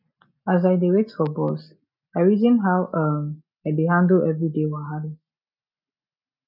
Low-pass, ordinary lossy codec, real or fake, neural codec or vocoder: 5.4 kHz; none; real; none